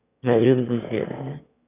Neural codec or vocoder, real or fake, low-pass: autoencoder, 22.05 kHz, a latent of 192 numbers a frame, VITS, trained on one speaker; fake; 3.6 kHz